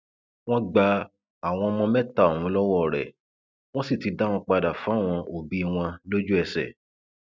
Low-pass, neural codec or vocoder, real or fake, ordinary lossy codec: none; none; real; none